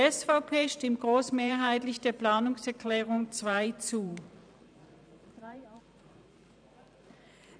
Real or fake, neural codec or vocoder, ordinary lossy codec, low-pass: fake; vocoder, 44.1 kHz, 128 mel bands every 512 samples, BigVGAN v2; none; 9.9 kHz